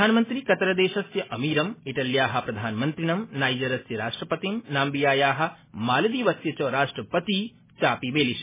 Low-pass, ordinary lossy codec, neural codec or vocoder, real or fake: 3.6 kHz; MP3, 16 kbps; none; real